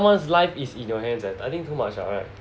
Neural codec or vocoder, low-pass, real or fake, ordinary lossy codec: none; none; real; none